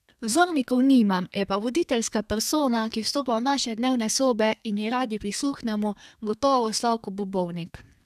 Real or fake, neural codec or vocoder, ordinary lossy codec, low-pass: fake; codec, 32 kHz, 1.9 kbps, SNAC; none; 14.4 kHz